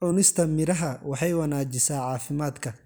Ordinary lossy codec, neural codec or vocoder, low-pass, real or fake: none; none; none; real